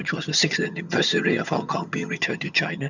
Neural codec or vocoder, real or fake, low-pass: vocoder, 22.05 kHz, 80 mel bands, HiFi-GAN; fake; 7.2 kHz